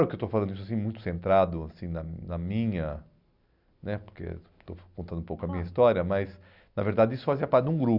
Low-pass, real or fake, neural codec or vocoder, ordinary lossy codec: 5.4 kHz; real; none; none